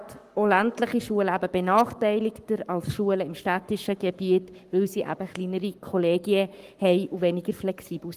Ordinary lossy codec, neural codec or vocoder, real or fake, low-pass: Opus, 32 kbps; codec, 44.1 kHz, 7.8 kbps, Pupu-Codec; fake; 14.4 kHz